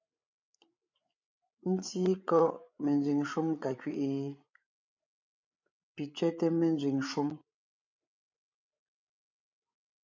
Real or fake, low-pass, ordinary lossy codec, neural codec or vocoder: fake; 7.2 kHz; MP3, 64 kbps; codec, 16 kHz, 8 kbps, FreqCodec, larger model